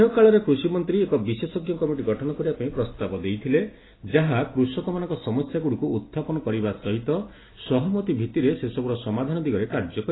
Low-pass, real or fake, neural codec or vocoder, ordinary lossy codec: 7.2 kHz; real; none; AAC, 16 kbps